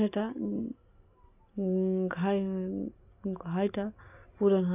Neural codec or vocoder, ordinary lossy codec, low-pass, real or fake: none; none; 3.6 kHz; real